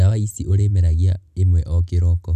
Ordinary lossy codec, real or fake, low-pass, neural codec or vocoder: none; real; 14.4 kHz; none